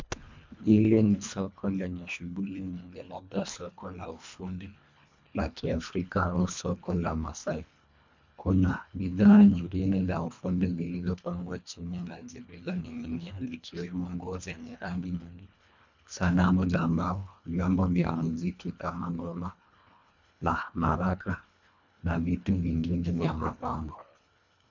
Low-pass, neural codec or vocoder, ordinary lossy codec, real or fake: 7.2 kHz; codec, 24 kHz, 1.5 kbps, HILCodec; MP3, 64 kbps; fake